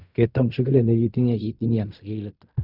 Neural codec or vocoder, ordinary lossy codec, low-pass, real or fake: codec, 16 kHz in and 24 kHz out, 0.4 kbps, LongCat-Audio-Codec, fine tuned four codebook decoder; none; 5.4 kHz; fake